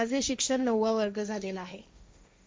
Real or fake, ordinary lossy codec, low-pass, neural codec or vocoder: fake; none; none; codec, 16 kHz, 1.1 kbps, Voila-Tokenizer